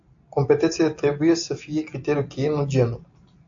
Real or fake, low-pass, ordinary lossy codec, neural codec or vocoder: real; 7.2 kHz; AAC, 64 kbps; none